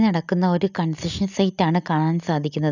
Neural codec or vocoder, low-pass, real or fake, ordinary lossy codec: none; 7.2 kHz; real; none